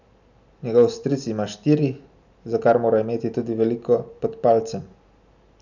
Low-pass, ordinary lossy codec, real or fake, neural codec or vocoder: 7.2 kHz; none; real; none